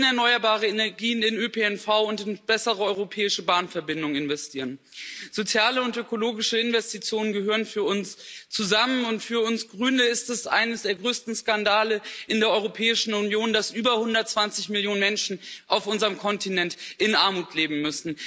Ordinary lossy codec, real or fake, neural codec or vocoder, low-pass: none; real; none; none